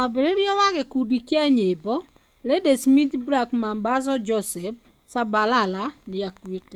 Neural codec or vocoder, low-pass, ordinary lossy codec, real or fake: codec, 44.1 kHz, 7.8 kbps, DAC; 19.8 kHz; none; fake